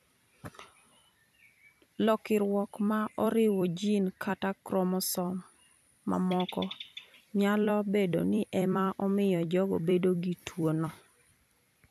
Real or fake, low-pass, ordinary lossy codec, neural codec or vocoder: fake; 14.4 kHz; none; vocoder, 44.1 kHz, 128 mel bands every 256 samples, BigVGAN v2